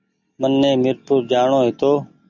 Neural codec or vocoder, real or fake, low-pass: none; real; 7.2 kHz